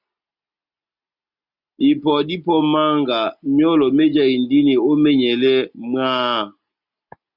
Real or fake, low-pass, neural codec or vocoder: real; 5.4 kHz; none